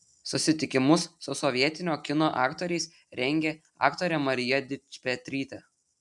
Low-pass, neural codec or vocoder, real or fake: 10.8 kHz; none; real